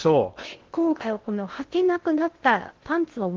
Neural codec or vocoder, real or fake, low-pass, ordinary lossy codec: codec, 16 kHz in and 24 kHz out, 0.6 kbps, FocalCodec, streaming, 2048 codes; fake; 7.2 kHz; Opus, 16 kbps